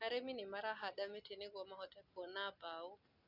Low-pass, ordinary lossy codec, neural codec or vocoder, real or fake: 5.4 kHz; none; none; real